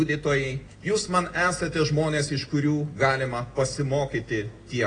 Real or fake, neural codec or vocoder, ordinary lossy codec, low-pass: real; none; AAC, 32 kbps; 9.9 kHz